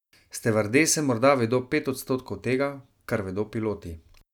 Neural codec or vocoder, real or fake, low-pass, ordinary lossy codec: none; real; 19.8 kHz; none